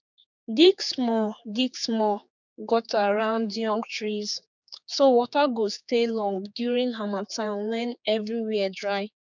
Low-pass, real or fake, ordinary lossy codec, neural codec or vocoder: 7.2 kHz; fake; none; codec, 16 kHz, 4 kbps, X-Codec, HuBERT features, trained on general audio